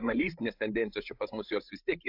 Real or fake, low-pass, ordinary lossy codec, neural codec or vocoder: fake; 5.4 kHz; AAC, 48 kbps; codec, 16 kHz, 16 kbps, FreqCodec, larger model